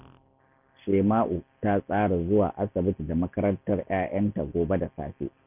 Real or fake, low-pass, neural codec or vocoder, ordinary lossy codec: real; 3.6 kHz; none; none